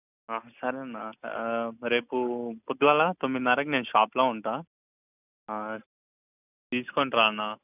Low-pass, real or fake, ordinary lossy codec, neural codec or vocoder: 3.6 kHz; real; none; none